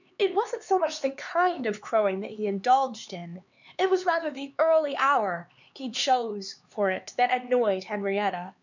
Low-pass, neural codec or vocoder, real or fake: 7.2 kHz; codec, 16 kHz, 4 kbps, X-Codec, HuBERT features, trained on LibriSpeech; fake